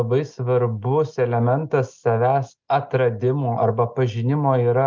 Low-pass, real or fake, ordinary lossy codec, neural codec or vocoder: 7.2 kHz; real; Opus, 24 kbps; none